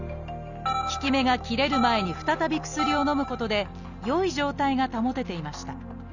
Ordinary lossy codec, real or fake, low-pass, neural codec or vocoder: none; real; 7.2 kHz; none